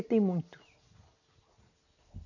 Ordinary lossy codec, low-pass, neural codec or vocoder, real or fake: none; 7.2 kHz; none; real